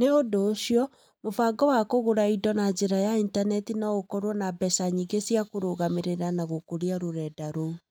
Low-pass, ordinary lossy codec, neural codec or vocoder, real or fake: 19.8 kHz; none; none; real